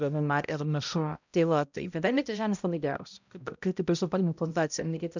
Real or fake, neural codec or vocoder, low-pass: fake; codec, 16 kHz, 0.5 kbps, X-Codec, HuBERT features, trained on balanced general audio; 7.2 kHz